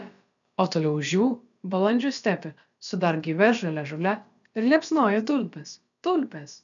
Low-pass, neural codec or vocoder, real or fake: 7.2 kHz; codec, 16 kHz, about 1 kbps, DyCAST, with the encoder's durations; fake